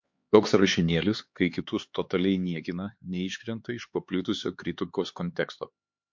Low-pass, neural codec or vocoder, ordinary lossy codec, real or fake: 7.2 kHz; codec, 16 kHz, 4 kbps, X-Codec, HuBERT features, trained on LibriSpeech; MP3, 48 kbps; fake